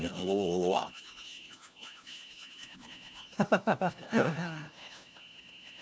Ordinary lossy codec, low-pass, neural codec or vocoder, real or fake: none; none; codec, 16 kHz, 1 kbps, FunCodec, trained on LibriTTS, 50 frames a second; fake